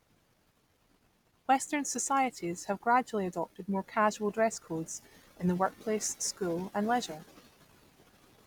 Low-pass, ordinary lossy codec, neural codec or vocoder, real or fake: none; none; none; real